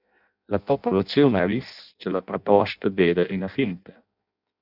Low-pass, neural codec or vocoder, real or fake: 5.4 kHz; codec, 16 kHz in and 24 kHz out, 0.6 kbps, FireRedTTS-2 codec; fake